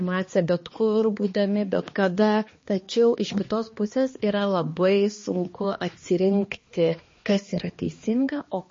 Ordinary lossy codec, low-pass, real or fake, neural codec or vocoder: MP3, 32 kbps; 7.2 kHz; fake; codec, 16 kHz, 2 kbps, X-Codec, HuBERT features, trained on balanced general audio